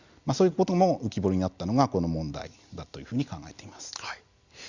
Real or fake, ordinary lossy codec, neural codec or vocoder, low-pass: real; Opus, 64 kbps; none; 7.2 kHz